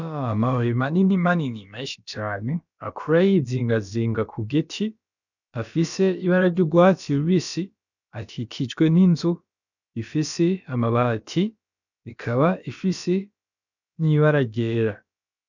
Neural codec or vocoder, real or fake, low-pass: codec, 16 kHz, about 1 kbps, DyCAST, with the encoder's durations; fake; 7.2 kHz